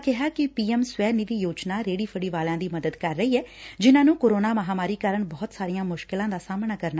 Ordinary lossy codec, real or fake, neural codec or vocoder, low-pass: none; real; none; none